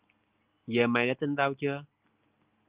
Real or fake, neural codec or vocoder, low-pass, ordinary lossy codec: real; none; 3.6 kHz; Opus, 24 kbps